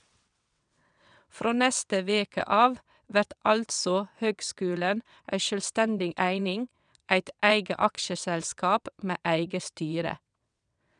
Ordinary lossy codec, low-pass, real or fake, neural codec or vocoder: none; 9.9 kHz; fake; vocoder, 22.05 kHz, 80 mel bands, WaveNeXt